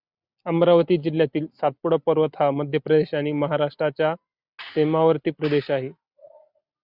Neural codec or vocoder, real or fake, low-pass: none; real; 5.4 kHz